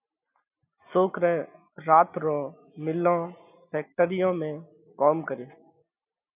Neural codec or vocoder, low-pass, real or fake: none; 3.6 kHz; real